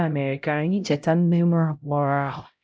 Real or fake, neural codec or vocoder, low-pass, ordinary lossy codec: fake; codec, 16 kHz, 0.5 kbps, X-Codec, HuBERT features, trained on LibriSpeech; none; none